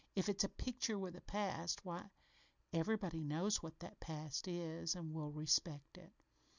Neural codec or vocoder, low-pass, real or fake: none; 7.2 kHz; real